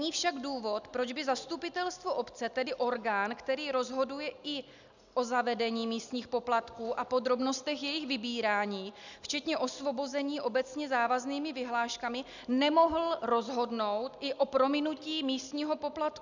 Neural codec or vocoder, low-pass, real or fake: none; 7.2 kHz; real